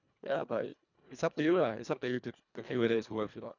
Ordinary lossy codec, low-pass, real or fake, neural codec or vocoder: none; 7.2 kHz; fake; codec, 24 kHz, 1.5 kbps, HILCodec